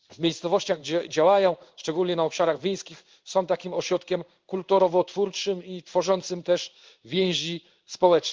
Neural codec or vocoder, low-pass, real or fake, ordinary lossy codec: codec, 16 kHz in and 24 kHz out, 1 kbps, XY-Tokenizer; 7.2 kHz; fake; Opus, 32 kbps